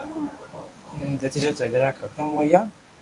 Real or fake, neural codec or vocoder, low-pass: fake; codec, 24 kHz, 0.9 kbps, WavTokenizer, medium speech release version 1; 10.8 kHz